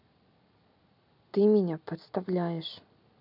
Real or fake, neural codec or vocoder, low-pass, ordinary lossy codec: real; none; 5.4 kHz; none